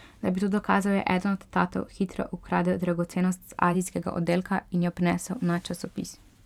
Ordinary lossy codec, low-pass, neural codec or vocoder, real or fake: none; 19.8 kHz; vocoder, 44.1 kHz, 128 mel bands every 512 samples, BigVGAN v2; fake